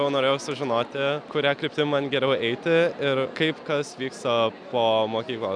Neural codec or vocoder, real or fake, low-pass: none; real; 9.9 kHz